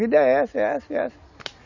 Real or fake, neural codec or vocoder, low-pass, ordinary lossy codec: real; none; 7.2 kHz; none